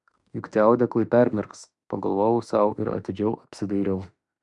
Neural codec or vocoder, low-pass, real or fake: autoencoder, 48 kHz, 32 numbers a frame, DAC-VAE, trained on Japanese speech; 10.8 kHz; fake